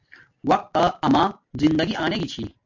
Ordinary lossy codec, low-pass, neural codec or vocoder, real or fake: MP3, 48 kbps; 7.2 kHz; none; real